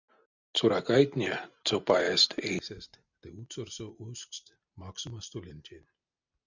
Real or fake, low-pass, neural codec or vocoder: real; 7.2 kHz; none